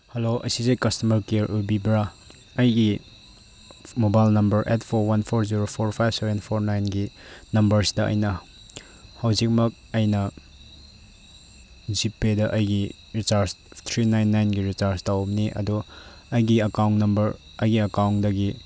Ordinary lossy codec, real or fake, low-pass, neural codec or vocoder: none; real; none; none